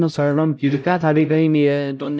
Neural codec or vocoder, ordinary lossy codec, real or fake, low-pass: codec, 16 kHz, 0.5 kbps, X-Codec, HuBERT features, trained on LibriSpeech; none; fake; none